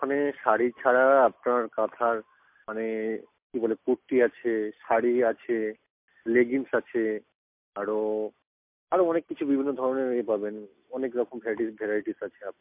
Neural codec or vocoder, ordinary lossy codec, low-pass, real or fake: none; MP3, 32 kbps; 3.6 kHz; real